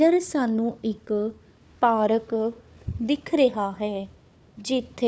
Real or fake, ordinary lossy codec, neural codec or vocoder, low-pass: fake; none; codec, 16 kHz, 4 kbps, FunCodec, trained on Chinese and English, 50 frames a second; none